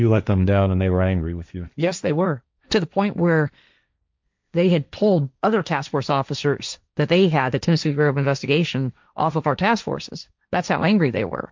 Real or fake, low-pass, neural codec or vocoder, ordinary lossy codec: fake; 7.2 kHz; codec, 16 kHz, 1.1 kbps, Voila-Tokenizer; MP3, 64 kbps